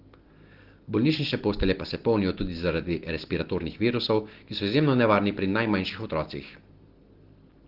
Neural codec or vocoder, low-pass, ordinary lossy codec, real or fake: none; 5.4 kHz; Opus, 16 kbps; real